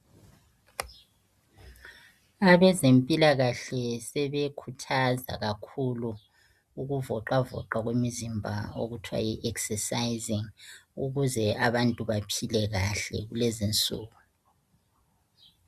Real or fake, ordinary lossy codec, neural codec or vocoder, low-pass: real; Opus, 64 kbps; none; 14.4 kHz